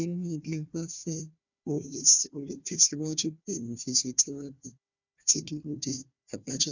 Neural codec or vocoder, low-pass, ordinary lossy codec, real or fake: codec, 16 kHz, 1 kbps, FunCodec, trained on Chinese and English, 50 frames a second; 7.2 kHz; none; fake